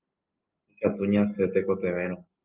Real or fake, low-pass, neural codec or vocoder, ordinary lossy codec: real; 3.6 kHz; none; Opus, 32 kbps